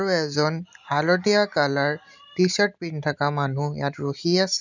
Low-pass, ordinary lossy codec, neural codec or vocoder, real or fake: 7.2 kHz; none; none; real